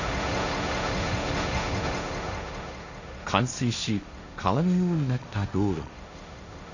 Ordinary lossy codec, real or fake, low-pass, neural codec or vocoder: none; fake; 7.2 kHz; codec, 16 kHz, 1.1 kbps, Voila-Tokenizer